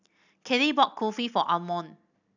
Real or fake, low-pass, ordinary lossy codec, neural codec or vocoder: real; 7.2 kHz; none; none